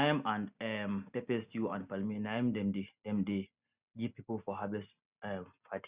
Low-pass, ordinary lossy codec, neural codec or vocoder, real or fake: 3.6 kHz; Opus, 64 kbps; none; real